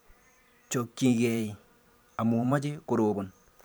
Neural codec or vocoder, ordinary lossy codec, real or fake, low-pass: vocoder, 44.1 kHz, 128 mel bands every 512 samples, BigVGAN v2; none; fake; none